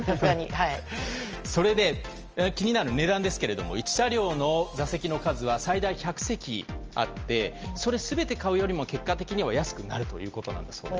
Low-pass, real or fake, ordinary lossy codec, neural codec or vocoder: 7.2 kHz; real; Opus, 24 kbps; none